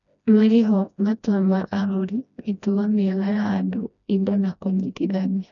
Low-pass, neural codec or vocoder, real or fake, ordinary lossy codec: 7.2 kHz; codec, 16 kHz, 1 kbps, FreqCodec, smaller model; fake; none